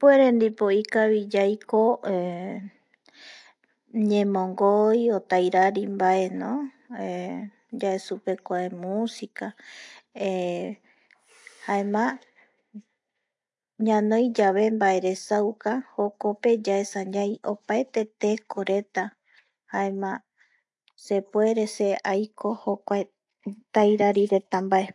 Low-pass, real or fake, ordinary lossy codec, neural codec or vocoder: 10.8 kHz; real; AAC, 64 kbps; none